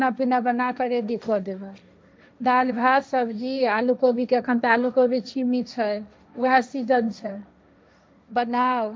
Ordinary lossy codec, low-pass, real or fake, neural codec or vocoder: none; none; fake; codec, 16 kHz, 1.1 kbps, Voila-Tokenizer